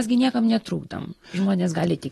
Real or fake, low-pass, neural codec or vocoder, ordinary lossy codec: real; 19.8 kHz; none; AAC, 32 kbps